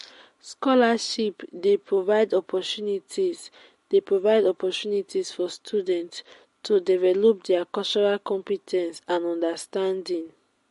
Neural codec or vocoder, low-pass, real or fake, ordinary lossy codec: none; 14.4 kHz; real; MP3, 48 kbps